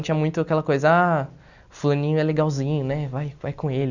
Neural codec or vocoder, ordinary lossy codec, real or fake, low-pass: none; none; real; 7.2 kHz